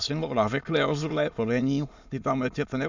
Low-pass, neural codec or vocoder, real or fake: 7.2 kHz; autoencoder, 22.05 kHz, a latent of 192 numbers a frame, VITS, trained on many speakers; fake